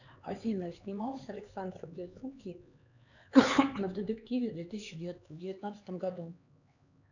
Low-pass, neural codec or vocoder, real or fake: 7.2 kHz; codec, 16 kHz, 4 kbps, X-Codec, HuBERT features, trained on LibriSpeech; fake